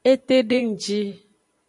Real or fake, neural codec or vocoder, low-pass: fake; vocoder, 44.1 kHz, 128 mel bands every 512 samples, BigVGAN v2; 10.8 kHz